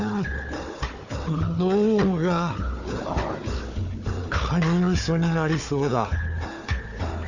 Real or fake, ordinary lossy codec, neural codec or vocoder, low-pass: fake; Opus, 64 kbps; codec, 16 kHz, 4 kbps, FunCodec, trained on Chinese and English, 50 frames a second; 7.2 kHz